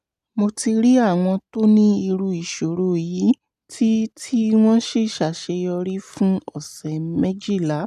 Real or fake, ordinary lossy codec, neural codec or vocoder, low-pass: real; none; none; 14.4 kHz